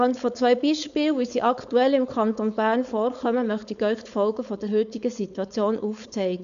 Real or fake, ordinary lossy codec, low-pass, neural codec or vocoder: fake; none; 7.2 kHz; codec, 16 kHz, 4.8 kbps, FACodec